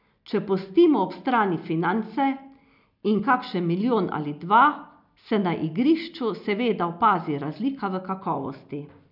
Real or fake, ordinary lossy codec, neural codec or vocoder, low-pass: real; none; none; 5.4 kHz